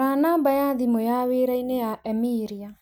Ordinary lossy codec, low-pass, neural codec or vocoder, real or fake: none; none; none; real